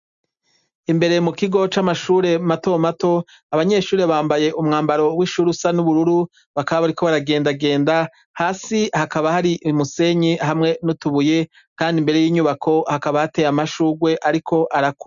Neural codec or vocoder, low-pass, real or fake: none; 7.2 kHz; real